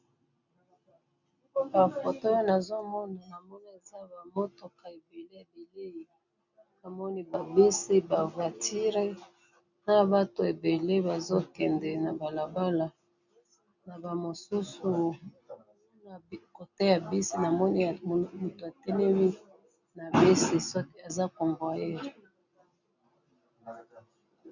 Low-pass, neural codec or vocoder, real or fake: 7.2 kHz; none; real